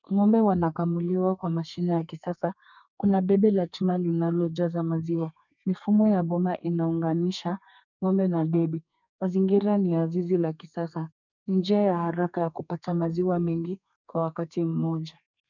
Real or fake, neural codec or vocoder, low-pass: fake; codec, 32 kHz, 1.9 kbps, SNAC; 7.2 kHz